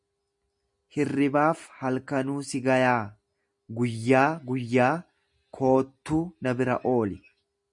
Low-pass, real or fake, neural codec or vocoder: 10.8 kHz; real; none